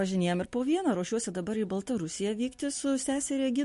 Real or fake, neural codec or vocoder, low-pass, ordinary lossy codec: real; none; 14.4 kHz; MP3, 48 kbps